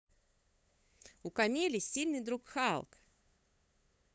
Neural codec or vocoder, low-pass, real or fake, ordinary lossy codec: codec, 16 kHz, 8 kbps, FunCodec, trained on LibriTTS, 25 frames a second; none; fake; none